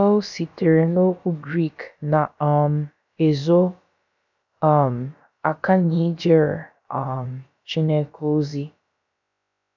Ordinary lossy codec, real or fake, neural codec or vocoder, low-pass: none; fake; codec, 16 kHz, about 1 kbps, DyCAST, with the encoder's durations; 7.2 kHz